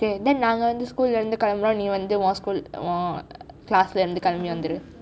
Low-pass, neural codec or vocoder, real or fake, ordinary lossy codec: none; none; real; none